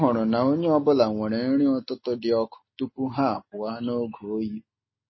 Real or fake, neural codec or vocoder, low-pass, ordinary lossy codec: real; none; 7.2 kHz; MP3, 24 kbps